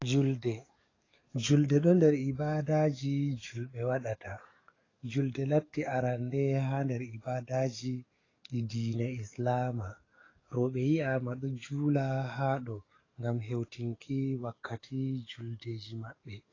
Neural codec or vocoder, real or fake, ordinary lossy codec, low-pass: codec, 44.1 kHz, 7.8 kbps, DAC; fake; AAC, 32 kbps; 7.2 kHz